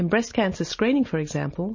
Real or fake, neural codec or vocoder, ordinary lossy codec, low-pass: real; none; MP3, 32 kbps; 7.2 kHz